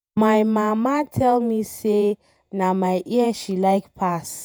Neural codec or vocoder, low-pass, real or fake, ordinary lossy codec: vocoder, 48 kHz, 128 mel bands, Vocos; none; fake; none